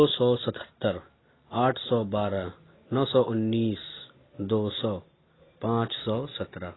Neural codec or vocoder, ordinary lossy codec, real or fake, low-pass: none; AAC, 16 kbps; real; 7.2 kHz